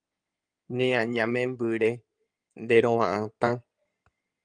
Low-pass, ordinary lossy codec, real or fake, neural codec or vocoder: 9.9 kHz; Opus, 32 kbps; fake; codec, 16 kHz in and 24 kHz out, 2.2 kbps, FireRedTTS-2 codec